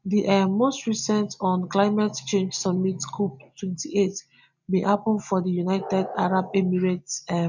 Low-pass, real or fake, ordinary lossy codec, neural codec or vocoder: 7.2 kHz; real; none; none